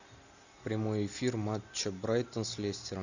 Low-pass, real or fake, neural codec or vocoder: 7.2 kHz; real; none